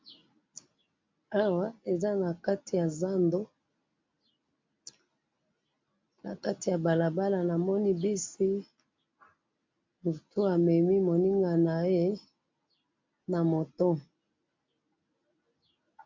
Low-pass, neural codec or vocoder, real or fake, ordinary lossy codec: 7.2 kHz; none; real; MP3, 48 kbps